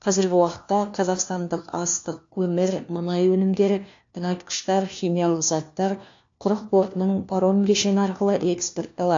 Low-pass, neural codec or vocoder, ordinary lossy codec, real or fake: 7.2 kHz; codec, 16 kHz, 1 kbps, FunCodec, trained on LibriTTS, 50 frames a second; AAC, 48 kbps; fake